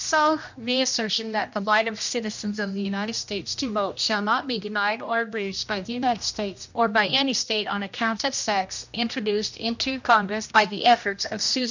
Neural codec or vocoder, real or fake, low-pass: codec, 16 kHz, 1 kbps, X-Codec, HuBERT features, trained on general audio; fake; 7.2 kHz